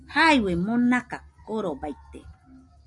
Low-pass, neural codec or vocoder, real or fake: 10.8 kHz; none; real